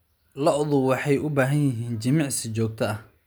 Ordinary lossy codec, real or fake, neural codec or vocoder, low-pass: none; real; none; none